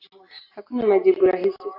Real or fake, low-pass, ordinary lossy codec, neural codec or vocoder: real; 5.4 kHz; AAC, 32 kbps; none